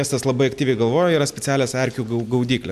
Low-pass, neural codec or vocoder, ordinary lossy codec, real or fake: 14.4 kHz; none; MP3, 96 kbps; real